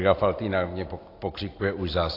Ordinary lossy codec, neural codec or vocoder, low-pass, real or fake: AAC, 32 kbps; none; 5.4 kHz; real